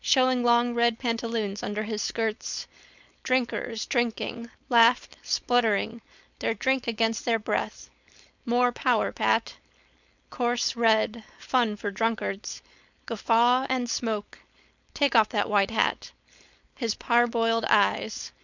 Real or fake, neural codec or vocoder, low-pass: fake; codec, 16 kHz, 4.8 kbps, FACodec; 7.2 kHz